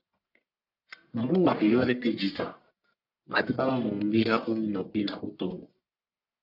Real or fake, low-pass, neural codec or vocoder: fake; 5.4 kHz; codec, 44.1 kHz, 1.7 kbps, Pupu-Codec